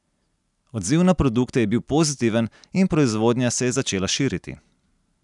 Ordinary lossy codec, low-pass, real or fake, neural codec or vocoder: none; 10.8 kHz; real; none